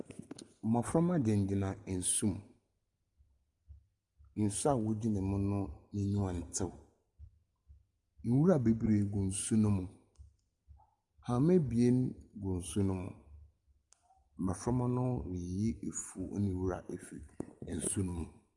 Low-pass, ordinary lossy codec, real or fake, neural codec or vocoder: 10.8 kHz; Opus, 32 kbps; real; none